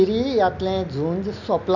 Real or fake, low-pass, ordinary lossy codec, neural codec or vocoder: real; 7.2 kHz; none; none